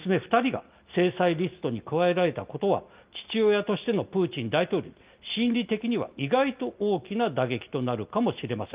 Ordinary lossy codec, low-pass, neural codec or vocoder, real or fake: Opus, 32 kbps; 3.6 kHz; none; real